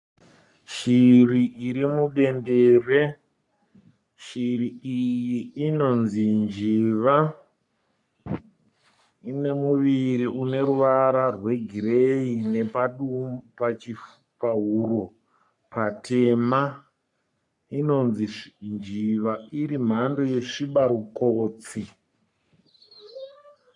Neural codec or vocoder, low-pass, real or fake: codec, 44.1 kHz, 3.4 kbps, Pupu-Codec; 10.8 kHz; fake